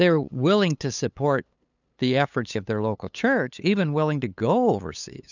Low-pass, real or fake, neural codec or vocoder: 7.2 kHz; fake; codec, 16 kHz, 8 kbps, FunCodec, trained on LibriTTS, 25 frames a second